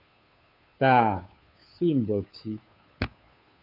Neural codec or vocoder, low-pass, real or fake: codec, 16 kHz, 2 kbps, FunCodec, trained on Chinese and English, 25 frames a second; 5.4 kHz; fake